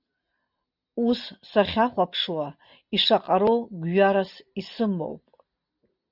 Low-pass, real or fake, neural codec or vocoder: 5.4 kHz; real; none